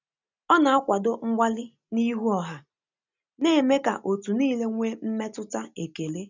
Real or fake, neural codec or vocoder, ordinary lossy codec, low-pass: real; none; none; 7.2 kHz